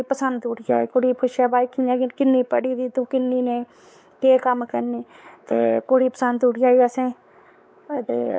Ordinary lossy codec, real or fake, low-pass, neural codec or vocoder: none; fake; none; codec, 16 kHz, 4 kbps, X-Codec, WavLM features, trained on Multilingual LibriSpeech